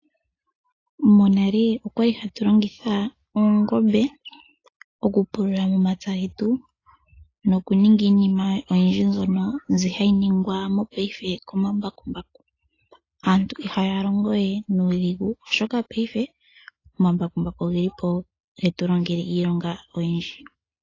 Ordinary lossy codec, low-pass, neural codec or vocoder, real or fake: AAC, 32 kbps; 7.2 kHz; none; real